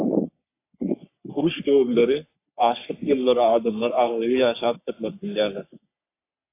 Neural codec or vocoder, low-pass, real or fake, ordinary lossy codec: codec, 44.1 kHz, 3.4 kbps, Pupu-Codec; 3.6 kHz; fake; AAC, 24 kbps